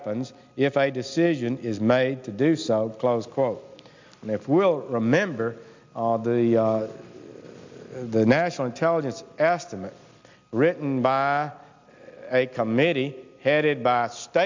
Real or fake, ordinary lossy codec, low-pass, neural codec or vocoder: real; MP3, 64 kbps; 7.2 kHz; none